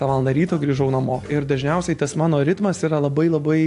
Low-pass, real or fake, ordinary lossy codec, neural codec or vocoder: 10.8 kHz; real; AAC, 64 kbps; none